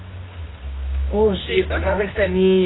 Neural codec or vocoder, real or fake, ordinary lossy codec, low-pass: codec, 24 kHz, 0.9 kbps, WavTokenizer, medium music audio release; fake; AAC, 16 kbps; 7.2 kHz